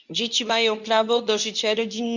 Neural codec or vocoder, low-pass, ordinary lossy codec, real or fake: codec, 24 kHz, 0.9 kbps, WavTokenizer, medium speech release version 2; 7.2 kHz; none; fake